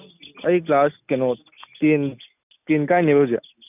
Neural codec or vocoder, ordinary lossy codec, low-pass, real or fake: none; none; 3.6 kHz; real